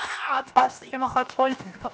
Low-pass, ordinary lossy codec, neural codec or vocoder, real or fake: none; none; codec, 16 kHz, 0.7 kbps, FocalCodec; fake